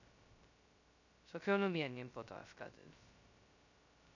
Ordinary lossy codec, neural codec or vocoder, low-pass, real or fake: none; codec, 16 kHz, 0.2 kbps, FocalCodec; 7.2 kHz; fake